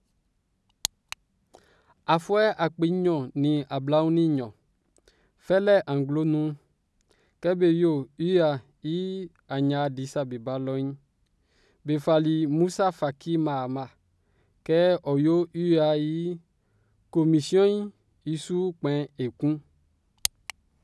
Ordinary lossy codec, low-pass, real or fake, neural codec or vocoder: none; none; real; none